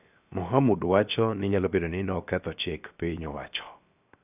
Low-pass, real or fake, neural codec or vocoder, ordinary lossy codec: 3.6 kHz; fake; codec, 16 kHz, 0.7 kbps, FocalCodec; none